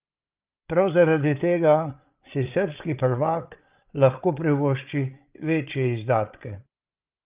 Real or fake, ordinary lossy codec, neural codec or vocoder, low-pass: fake; Opus, 32 kbps; codec, 16 kHz, 8 kbps, FreqCodec, larger model; 3.6 kHz